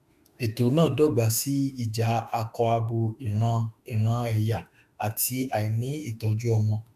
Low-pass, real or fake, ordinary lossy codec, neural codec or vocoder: 14.4 kHz; fake; none; autoencoder, 48 kHz, 32 numbers a frame, DAC-VAE, trained on Japanese speech